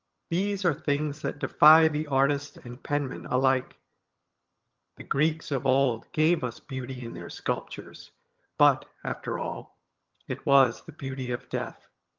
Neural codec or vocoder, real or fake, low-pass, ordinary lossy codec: vocoder, 22.05 kHz, 80 mel bands, HiFi-GAN; fake; 7.2 kHz; Opus, 32 kbps